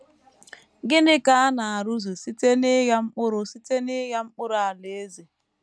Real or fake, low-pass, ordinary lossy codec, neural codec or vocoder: real; none; none; none